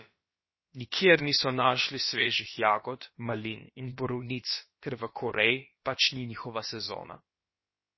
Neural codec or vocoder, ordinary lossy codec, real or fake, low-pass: codec, 16 kHz, about 1 kbps, DyCAST, with the encoder's durations; MP3, 24 kbps; fake; 7.2 kHz